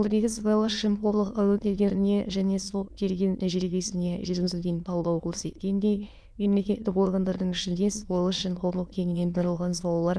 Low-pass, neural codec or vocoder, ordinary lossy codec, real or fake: none; autoencoder, 22.05 kHz, a latent of 192 numbers a frame, VITS, trained on many speakers; none; fake